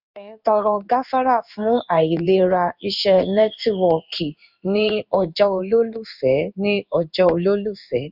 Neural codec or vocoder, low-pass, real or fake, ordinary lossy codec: codec, 16 kHz in and 24 kHz out, 1 kbps, XY-Tokenizer; 5.4 kHz; fake; none